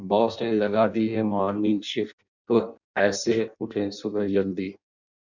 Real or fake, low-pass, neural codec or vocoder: fake; 7.2 kHz; codec, 16 kHz in and 24 kHz out, 0.6 kbps, FireRedTTS-2 codec